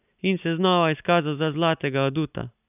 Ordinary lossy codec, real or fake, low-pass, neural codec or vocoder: none; fake; 3.6 kHz; vocoder, 44.1 kHz, 128 mel bands every 256 samples, BigVGAN v2